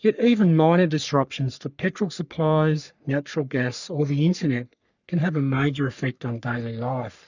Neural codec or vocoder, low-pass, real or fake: codec, 44.1 kHz, 3.4 kbps, Pupu-Codec; 7.2 kHz; fake